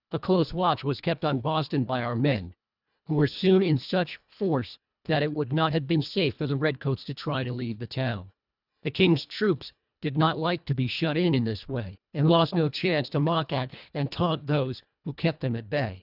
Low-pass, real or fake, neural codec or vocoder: 5.4 kHz; fake; codec, 24 kHz, 1.5 kbps, HILCodec